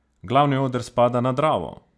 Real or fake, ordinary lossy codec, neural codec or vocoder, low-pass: real; none; none; none